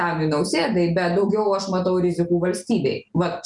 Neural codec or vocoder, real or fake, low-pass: none; real; 10.8 kHz